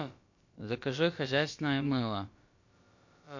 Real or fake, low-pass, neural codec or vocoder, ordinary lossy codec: fake; 7.2 kHz; codec, 16 kHz, about 1 kbps, DyCAST, with the encoder's durations; MP3, 48 kbps